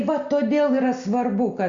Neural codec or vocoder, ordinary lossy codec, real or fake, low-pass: none; Opus, 64 kbps; real; 7.2 kHz